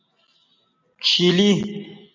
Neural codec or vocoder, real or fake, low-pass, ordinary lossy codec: none; real; 7.2 kHz; MP3, 64 kbps